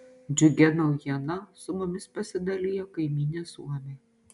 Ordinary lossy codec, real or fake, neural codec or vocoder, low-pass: MP3, 96 kbps; real; none; 10.8 kHz